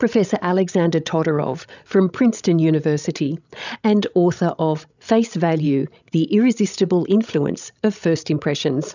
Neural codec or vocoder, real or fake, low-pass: codec, 16 kHz, 16 kbps, FreqCodec, larger model; fake; 7.2 kHz